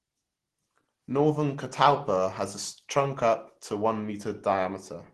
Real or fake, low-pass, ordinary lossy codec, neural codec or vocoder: real; 10.8 kHz; Opus, 16 kbps; none